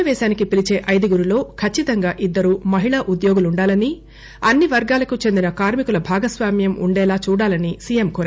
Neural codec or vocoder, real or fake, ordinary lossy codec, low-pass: none; real; none; none